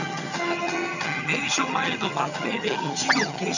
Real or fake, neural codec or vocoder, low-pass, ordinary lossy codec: fake; vocoder, 22.05 kHz, 80 mel bands, HiFi-GAN; 7.2 kHz; none